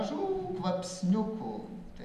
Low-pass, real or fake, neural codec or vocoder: 14.4 kHz; real; none